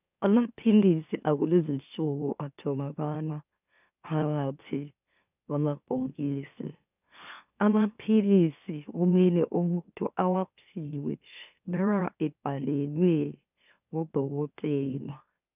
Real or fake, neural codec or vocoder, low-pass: fake; autoencoder, 44.1 kHz, a latent of 192 numbers a frame, MeloTTS; 3.6 kHz